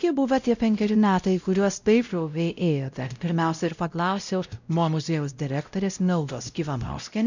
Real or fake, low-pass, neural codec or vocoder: fake; 7.2 kHz; codec, 16 kHz, 0.5 kbps, X-Codec, WavLM features, trained on Multilingual LibriSpeech